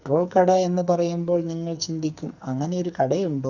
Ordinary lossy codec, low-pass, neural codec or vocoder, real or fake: Opus, 64 kbps; 7.2 kHz; codec, 44.1 kHz, 2.6 kbps, SNAC; fake